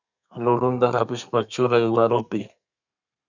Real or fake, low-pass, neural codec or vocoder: fake; 7.2 kHz; codec, 32 kHz, 1.9 kbps, SNAC